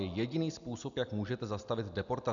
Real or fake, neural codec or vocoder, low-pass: real; none; 7.2 kHz